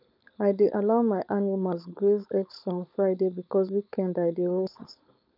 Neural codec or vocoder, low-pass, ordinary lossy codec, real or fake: codec, 16 kHz, 4.8 kbps, FACodec; 5.4 kHz; none; fake